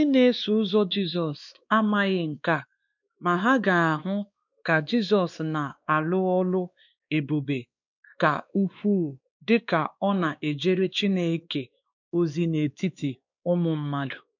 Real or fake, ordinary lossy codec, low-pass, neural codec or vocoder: fake; none; 7.2 kHz; codec, 16 kHz, 2 kbps, X-Codec, WavLM features, trained on Multilingual LibriSpeech